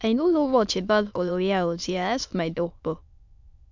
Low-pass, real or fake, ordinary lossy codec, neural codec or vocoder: 7.2 kHz; fake; MP3, 64 kbps; autoencoder, 22.05 kHz, a latent of 192 numbers a frame, VITS, trained on many speakers